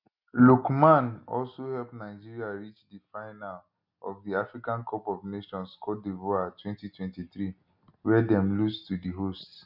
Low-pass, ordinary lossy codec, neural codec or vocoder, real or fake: 5.4 kHz; none; none; real